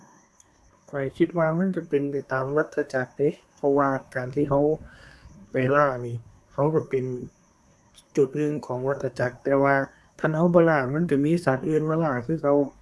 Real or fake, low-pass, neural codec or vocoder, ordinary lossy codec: fake; none; codec, 24 kHz, 1 kbps, SNAC; none